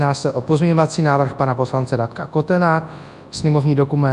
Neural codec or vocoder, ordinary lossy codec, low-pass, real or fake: codec, 24 kHz, 0.9 kbps, WavTokenizer, large speech release; AAC, 96 kbps; 10.8 kHz; fake